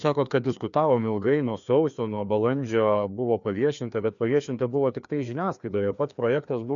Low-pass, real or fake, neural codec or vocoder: 7.2 kHz; fake; codec, 16 kHz, 2 kbps, FreqCodec, larger model